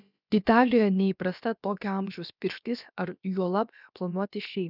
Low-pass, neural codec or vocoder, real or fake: 5.4 kHz; codec, 16 kHz, about 1 kbps, DyCAST, with the encoder's durations; fake